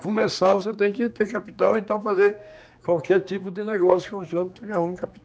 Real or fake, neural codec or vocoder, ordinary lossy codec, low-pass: fake; codec, 16 kHz, 2 kbps, X-Codec, HuBERT features, trained on general audio; none; none